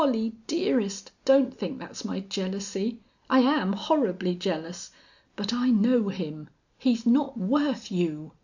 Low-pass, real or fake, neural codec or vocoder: 7.2 kHz; real; none